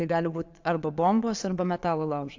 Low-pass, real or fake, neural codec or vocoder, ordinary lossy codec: 7.2 kHz; fake; vocoder, 22.05 kHz, 80 mel bands, Vocos; AAC, 48 kbps